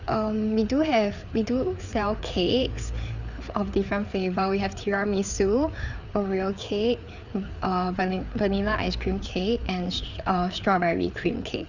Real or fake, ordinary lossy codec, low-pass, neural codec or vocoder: fake; none; 7.2 kHz; codec, 16 kHz, 4 kbps, FreqCodec, larger model